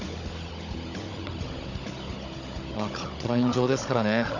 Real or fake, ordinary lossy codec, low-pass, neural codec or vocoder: fake; none; 7.2 kHz; codec, 16 kHz, 16 kbps, FunCodec, trained on Chinese and English, 50 frames a second